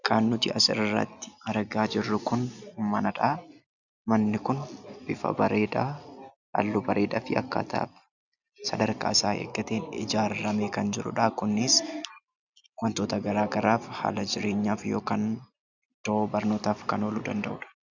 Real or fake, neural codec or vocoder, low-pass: real; none; 7.2 kHz